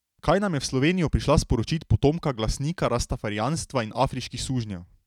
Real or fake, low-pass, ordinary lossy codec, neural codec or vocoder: real; 19.8 kHz; none; none